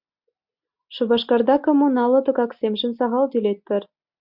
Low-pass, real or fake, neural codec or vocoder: 5.4 kHz; real; none